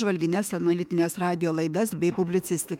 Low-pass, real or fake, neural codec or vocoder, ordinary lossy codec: 19.8 kHz; fake; autoencoder, 48 kHz, 32 numbers a frame, DAC-VAE, trained on Japanese speech; MP3, 96 kbps